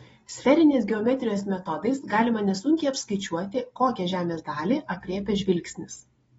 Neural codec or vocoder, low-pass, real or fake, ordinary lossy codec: none; 10.8 kHz; real; AAC, 24 kbps